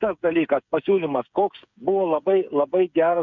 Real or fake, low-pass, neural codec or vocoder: fake; 7.2 kHz; codec, 16 kHz, 8 kbps, FreqCodec, smaller model